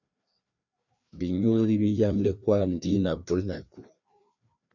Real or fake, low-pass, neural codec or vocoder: fake; 7.2 kHz; codec, 16 kHz, 2 kbps, FreqCodec, larger model